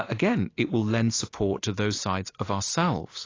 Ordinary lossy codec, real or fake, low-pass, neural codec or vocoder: AAC, 32 kbps; real; 7.2 kHz; none